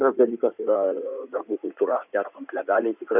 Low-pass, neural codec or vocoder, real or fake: 3.6 kHz; codec, 16 kHz in and 24 kHz out, 2.2 kbps, FireRedTTS-2 codec; fake